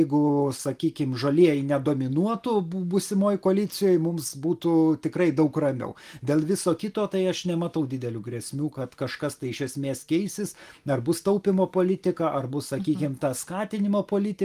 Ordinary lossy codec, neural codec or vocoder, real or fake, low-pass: Opus, 24 kbps; none; real; 14.4 kHz